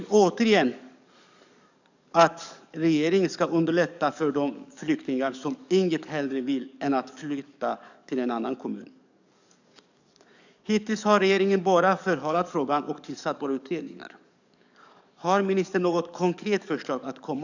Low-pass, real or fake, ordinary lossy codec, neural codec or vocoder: 7.2 kHz; fake; none; codec, 44.1 kHz, 7.8 kbps, DAC